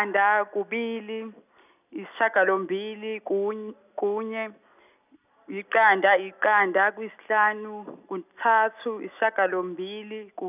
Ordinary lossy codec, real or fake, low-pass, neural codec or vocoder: none; real; 3.6 kHz; none